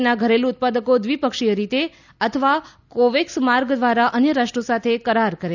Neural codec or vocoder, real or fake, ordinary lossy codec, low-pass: none; real; none; 7.2 kHz